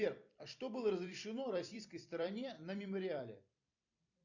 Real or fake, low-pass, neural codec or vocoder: fake; 7.2 kHz; vocoder, 44.1 kHz, 128 mel bands every 256 samples, BigVGAN v2